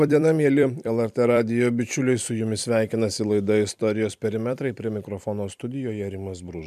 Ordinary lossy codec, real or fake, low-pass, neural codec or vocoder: MP3, 96 kbps; fake; 14.4 kHz; vocoder, 44.1 kHz, 128 mel bands every 256 samples, BigVGAN v2